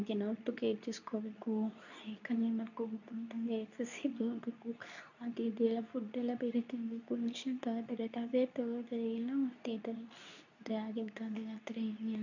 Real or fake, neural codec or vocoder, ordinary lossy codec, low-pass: fake; codec, 24 kHz, 0.9 kbps, WavTokenizer, medium speech release version 2; none; 7.2 kHz